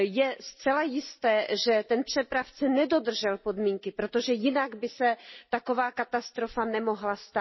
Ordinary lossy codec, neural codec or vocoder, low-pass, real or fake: MP3, 24 kbps; none; 7.2 kHz; real